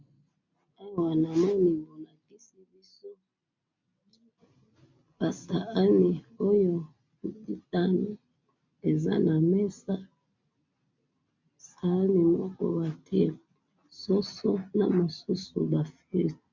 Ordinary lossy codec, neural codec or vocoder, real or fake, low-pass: MP3, 64 kbps; none; real; 7.2 kHz